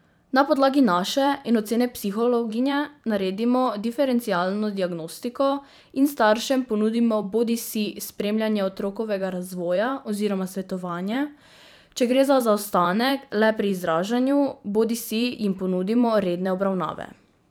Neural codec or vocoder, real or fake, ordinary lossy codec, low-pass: vocoder, 44.1 kHz, 128 mel bands every 512 samples, BigVGAN v2; fake; none; none